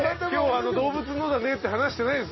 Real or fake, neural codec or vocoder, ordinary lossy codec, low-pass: real; none; MP3, 24 kbps; 7.2 kHz